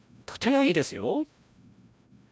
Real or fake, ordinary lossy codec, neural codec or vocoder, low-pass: fake; none; codec, 16 kHz, 0.5 kbps, FreqCodec, larger model; none